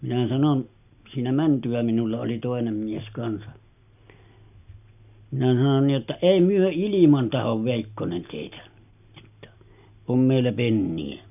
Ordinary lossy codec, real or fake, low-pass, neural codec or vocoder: none; real; 3.6 kHz; none